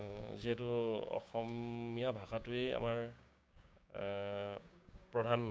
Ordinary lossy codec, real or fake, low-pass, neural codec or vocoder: none; fake; none; codec, 16 kHz, 6 kbps, DAC